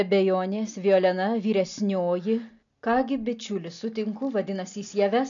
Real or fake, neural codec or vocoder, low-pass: real; none; 7.2 kHz